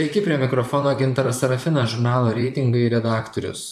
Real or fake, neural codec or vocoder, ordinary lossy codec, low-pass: fake; vocoder, 44.1 kHz, 128 mel bands, Pupu-Vocoder; AAC, 96 kbps; 14.4 kHz